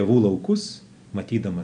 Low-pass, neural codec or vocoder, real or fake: 9.9 kHz; none; real